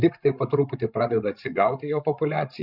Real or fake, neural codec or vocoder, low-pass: fake; codec, 16 kHz, 16 kbps, FreqCodec, larger model; 5.4 kHz